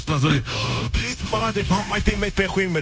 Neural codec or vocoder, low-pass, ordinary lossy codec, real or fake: codec, 16 kHz, 0.9 kbps, LongCat-Audio-Codec; none; none; fake